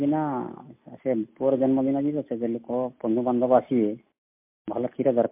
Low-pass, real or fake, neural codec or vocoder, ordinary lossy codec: 3.6 kHz; real; none; MP3, 24 kbps